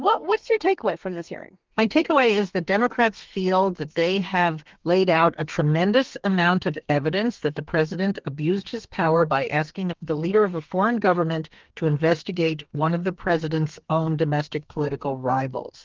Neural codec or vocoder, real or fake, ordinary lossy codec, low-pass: codec, 32 kHz, 1.9 kbps, SNAC; fake; Opus, 32 kbps; 7.2 kHz